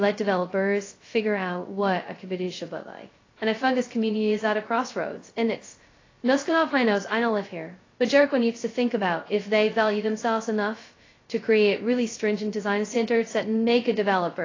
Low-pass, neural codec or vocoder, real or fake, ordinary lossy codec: 7.2 kHz; codec, 16 kHz, 0.2 kbps, FocalCodec; fake; AAC, 32 kbps